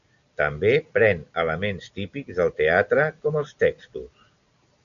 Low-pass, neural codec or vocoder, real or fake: 7.2 kHz; none; real